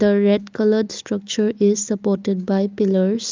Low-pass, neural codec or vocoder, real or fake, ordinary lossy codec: 7.2 kHz; none; real; Opus, 24 kbps